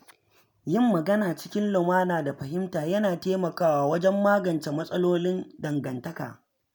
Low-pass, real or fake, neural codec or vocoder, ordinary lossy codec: none; real; none; none